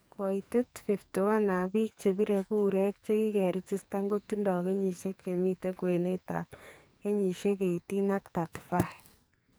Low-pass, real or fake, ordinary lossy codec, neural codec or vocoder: none; fake; none; codec, 44.1 kHz, 2.6 kbps, SNAC